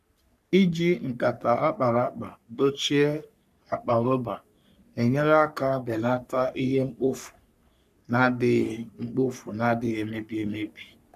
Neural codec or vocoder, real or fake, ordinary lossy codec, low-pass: codec, 44.1 kHz, 3.4 kbps, Pupu-Codec; fake; none; 14.4 kHz